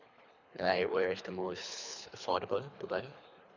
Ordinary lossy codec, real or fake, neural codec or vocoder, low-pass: none; fake; codec, 24 kHz, 3 kbps, HILCodec; 7.2 kHz